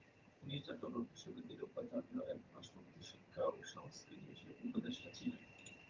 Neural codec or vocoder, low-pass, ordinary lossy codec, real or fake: vocoder, 22.05 kHz, 80 mel bands, HiFi-GAN; 7.2 kHz; Opus, 24 kbps; fake